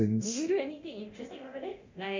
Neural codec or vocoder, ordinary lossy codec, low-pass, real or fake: codec, 24 kHz, 0.9 kbps, DualCodec; MP3, 48 kbps; 7.2 kHz; fake